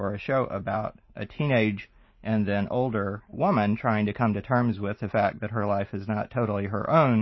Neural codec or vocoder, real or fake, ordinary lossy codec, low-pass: none; real; MP3, 24 kbps; 7.2 kHz